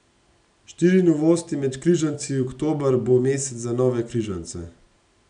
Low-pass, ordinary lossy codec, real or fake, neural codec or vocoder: 9.9 kHz; none; real; none